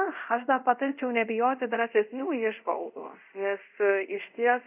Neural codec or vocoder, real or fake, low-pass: codec, 24 kHz, 0.5 kbps, DualCodec; fake; 3.6 kHz